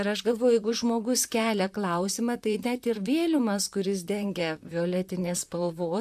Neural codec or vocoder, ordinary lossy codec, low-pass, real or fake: vocoder, 44.1 kHz, 128 mel bands, Pupu-Vocoder; AAC, 96 kbps; 14.4 kHz; fake